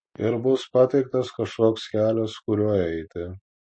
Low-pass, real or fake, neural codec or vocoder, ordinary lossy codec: 9.9 kHz; real; none; MP3, 32 kbps